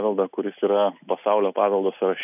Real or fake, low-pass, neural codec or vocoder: real; 3.6 kHz; none